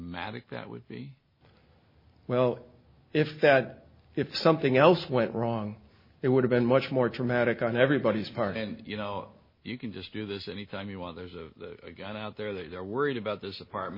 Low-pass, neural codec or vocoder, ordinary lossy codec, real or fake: 5.4 kHz; none; MP3, 24 kbps; real